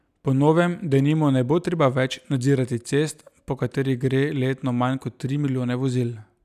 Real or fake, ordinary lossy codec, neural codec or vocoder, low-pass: real; none; none; 14.4 kHz